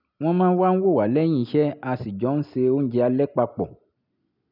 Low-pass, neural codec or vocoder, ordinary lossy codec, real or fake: 5.4 kHz; none; none; real